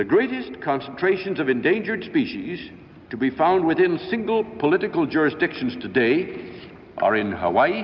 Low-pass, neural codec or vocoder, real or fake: 7.2 kHz; none; real